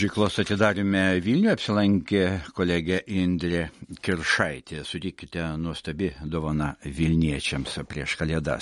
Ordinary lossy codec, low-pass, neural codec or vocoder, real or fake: MP3, 48 kbps; 19.8 kHz; none; real